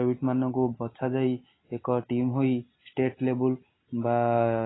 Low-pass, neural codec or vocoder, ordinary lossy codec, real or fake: 7.2 kHz; none; AAC, 16 kbps; real